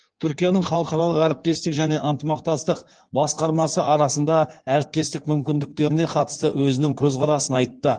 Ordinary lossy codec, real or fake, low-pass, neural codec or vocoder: Opus, 24 kbps; fake; 9.9 kHz; codec, 16 kHz in and 24 kHz out, 1.1 kbps, FireRedTTS-2 codec